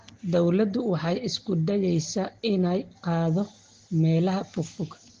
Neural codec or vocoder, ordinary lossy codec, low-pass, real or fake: none; Opus, 16 kbps; 7.2 kHz; real